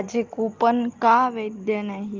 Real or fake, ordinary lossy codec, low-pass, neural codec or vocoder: real; Opus, 32 kbps; 7.2 kHz; none